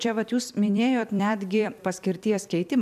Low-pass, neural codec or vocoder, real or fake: 14.4 kHz; vocoder, 44.1 kHz, 128 mel bands every 256 samples, BigVGAN v2; fake